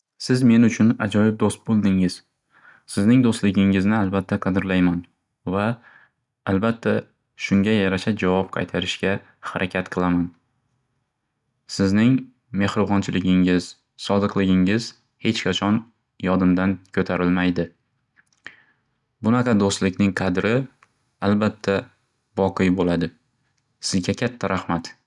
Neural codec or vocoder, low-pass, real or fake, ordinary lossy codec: none; 10.8 kHz; real; none